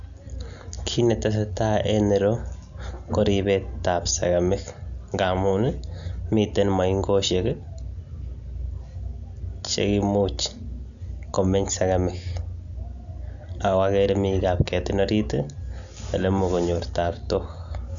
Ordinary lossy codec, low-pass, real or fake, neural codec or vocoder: none; 7.2 kHz; real; none